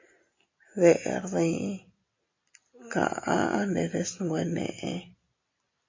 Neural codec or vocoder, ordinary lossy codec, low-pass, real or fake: none; MP3, 32 kbps; 7.2 kHz; real